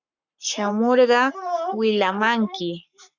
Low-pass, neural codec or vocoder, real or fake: 7.2 kHz; codec, 44.1 kHz, 7.8 kbps, Pupu-Codec; fake